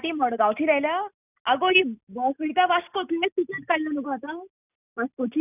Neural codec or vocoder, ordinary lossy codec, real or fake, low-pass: none; none; real; 3.6 kHz